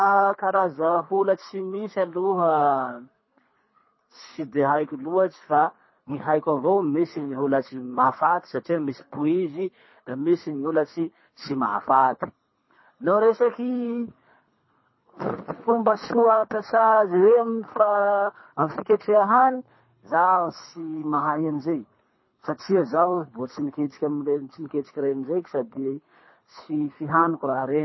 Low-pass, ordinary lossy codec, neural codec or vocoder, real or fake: 7.2 kHz; MP3, 24 kbps; codec, 24 kHz, 3 kbps, HILCodec; fake